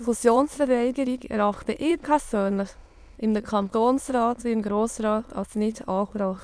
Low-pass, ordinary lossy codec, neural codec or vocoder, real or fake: none; none; autoencoder, 22.05 kHz, a latent of 192 numbers a frame, VITS, trained on many speakers; fake